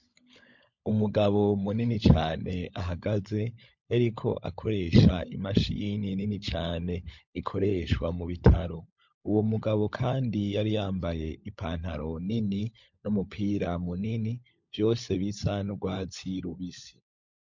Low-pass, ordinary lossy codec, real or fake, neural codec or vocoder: 7.2 kHz; MP3, 48 kbps; fake; codec, 16 kHz, 16 kbps, FunCodec, trained on LibriTTS, 50 frames a second